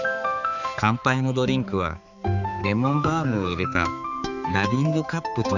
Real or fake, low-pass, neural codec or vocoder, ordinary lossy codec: fake; 7.2 kHz; codec, 16 kHz, 4 kbps, X-Codec, HuBERT features, trained on balanced general audio; none